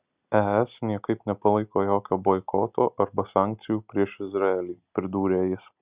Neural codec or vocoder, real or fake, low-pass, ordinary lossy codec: none; real; 3.6 kHz; Opus, 64 kbps